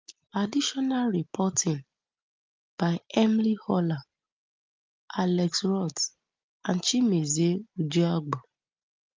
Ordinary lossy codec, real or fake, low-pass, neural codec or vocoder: Opus, 24 kbps; real; 7.2 kHz; none